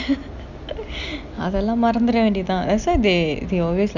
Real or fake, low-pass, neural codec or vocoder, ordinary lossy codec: real; 7.2 kHz; none; none